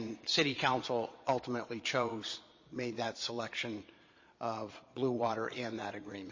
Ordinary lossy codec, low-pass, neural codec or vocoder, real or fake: MP3, 32 kbps; 7.2 kHz; vocoder, 22.05 kHz, 80 mel bands, Vocos; fake